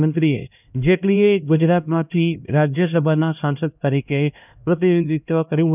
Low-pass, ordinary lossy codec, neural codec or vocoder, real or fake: 3.6 kHz; none; codec, 16 kHz, 1 kbps, X-Codec, HuBERT features, trained on LibriSpeech; fake